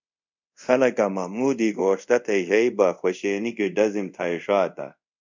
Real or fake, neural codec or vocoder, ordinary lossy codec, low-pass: fake; codec, 24 kHz, 0.5 kbps, DualCodec; MP3, 64 kbps; 7.2 kHz